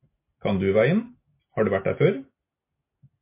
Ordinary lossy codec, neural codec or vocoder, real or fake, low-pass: MP3, 24 kbps; none; real; 3.6 kHz